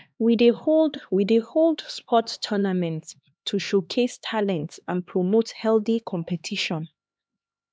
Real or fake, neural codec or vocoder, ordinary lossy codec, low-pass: fake; codec, 16 kHz, 2 kbps, X-Codec, HuBERT features, trained on LibriSpeech; none; none